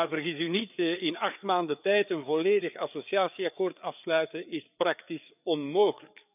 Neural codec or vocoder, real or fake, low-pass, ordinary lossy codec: codec, 16 kHz, 4 kbps, FreqCodec, larger model; fake; 3.6 kHz; none